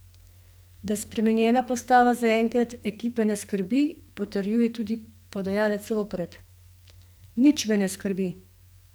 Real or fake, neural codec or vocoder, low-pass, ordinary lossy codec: fake; codec, 44.1 kHz, 2.6 kbps, SNAC; none; none